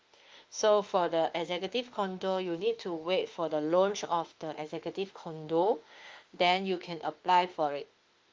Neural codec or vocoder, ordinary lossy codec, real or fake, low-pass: autoencoder, 48 kHz, 32 numbers a frame, DAC-VAE, trained on Japanese speech; Opus, 24 kbps; fake; 7.2 kHz